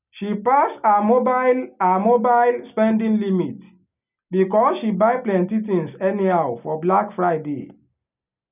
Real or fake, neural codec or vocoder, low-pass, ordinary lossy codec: real; none; 3.6 kHz; none